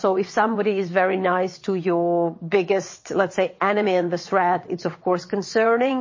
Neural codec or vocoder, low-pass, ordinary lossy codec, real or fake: vocoder, 22.05 kHz, 80 mel bands, WaveNeXt; 7.2 kHz; MP3, 32 kbps; fake